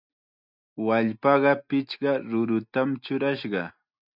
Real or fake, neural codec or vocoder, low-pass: real; none; 5.4 kHz